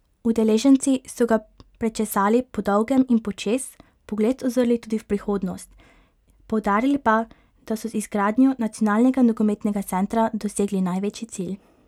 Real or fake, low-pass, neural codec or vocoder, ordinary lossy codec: real; 19.8 kHz; none; none